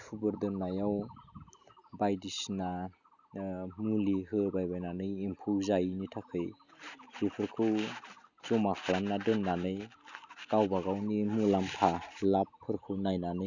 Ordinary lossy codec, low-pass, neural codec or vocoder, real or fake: none; 7.2 kHz; none; real